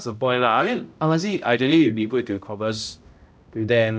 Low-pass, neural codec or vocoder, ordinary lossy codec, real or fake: none; codec, 16 kHz, 0.5 kbps, X-Codec, HuBERT features, trained on balanced general audio; none; fake